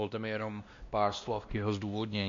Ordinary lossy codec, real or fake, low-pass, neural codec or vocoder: MP3, 64 kbps; fake; 7.2 kHz; codec, 16 kHz, 1 kbps, X-Codec, WavLM features, trained on Multilingual LibriSpeech